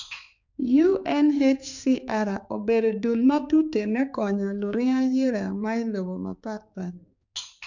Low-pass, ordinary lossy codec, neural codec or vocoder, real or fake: 7.2 kHz; none; codec, 16 kHz, 4 kbps, X-Codec, HuBERT features, trained on balanced general audio; fake